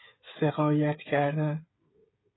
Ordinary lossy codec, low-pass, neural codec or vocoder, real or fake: AAC, 16 kbps; 7.2 kHz; codec, 16 kHz, 16 kbps, FreqCodec, smaller model; fake